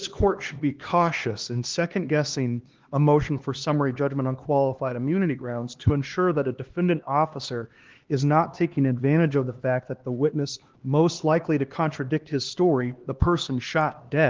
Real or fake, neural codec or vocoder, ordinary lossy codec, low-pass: fake; codec, 16 kHz, 2 kbps, X-Codec, HuBERT features, trained on LibriSpeech; Opus, 16 kbps; 7.2 kHz